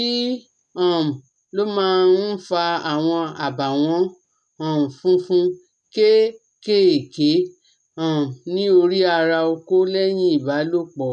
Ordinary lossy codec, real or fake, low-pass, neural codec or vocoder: none; real; none; none